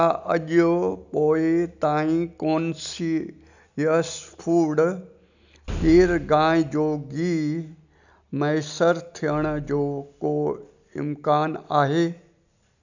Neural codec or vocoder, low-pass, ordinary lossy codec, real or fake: none; 7.2 kHz; none; real